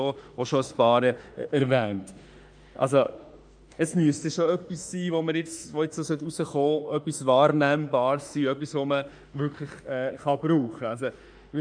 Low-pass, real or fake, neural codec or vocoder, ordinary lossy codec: 9.9 kHz; fake; autoencoder, 48 kHz, 32 numbers a frame, DAC-VAE, trained on Japanese speech; none